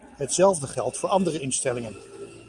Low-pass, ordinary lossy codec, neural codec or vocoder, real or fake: 10.8 kHz; Opus, 32 kbps; none; real